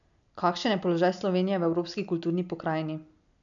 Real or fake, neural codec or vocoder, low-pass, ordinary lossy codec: real; none; 7.2 kHz; none